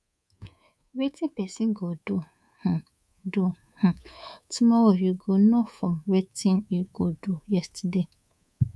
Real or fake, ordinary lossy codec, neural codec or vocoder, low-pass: fake; none; codec, 24 kHz, 3.1 kbps, DualCodec; none